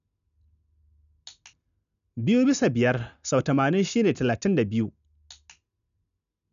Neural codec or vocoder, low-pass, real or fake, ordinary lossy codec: none; 7.2 kHz; real; none